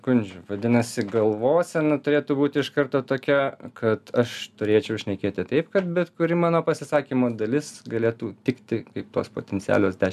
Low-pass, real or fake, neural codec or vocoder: 14.4 kHz; real; none